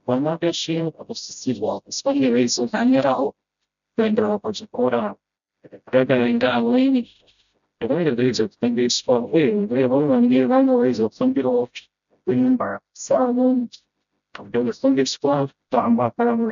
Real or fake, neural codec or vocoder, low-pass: fake; codec, 16 kHz, 0.5 kbps, FreqCodec, smaller model; 7.2 kHz